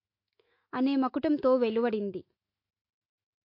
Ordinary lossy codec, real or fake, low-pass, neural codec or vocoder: MP3, 32 kbps; fake; 5.4 kHz; autoencoder, 48 kHz, 128 numbers a frame, DAC-VAE, trained on Japanese speech